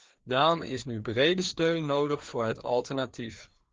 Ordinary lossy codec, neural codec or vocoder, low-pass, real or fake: Opus, 16 kbps; codec, 16 kHz, 2 kbps, FreqCodec, larger model; 7.2 kHz; fake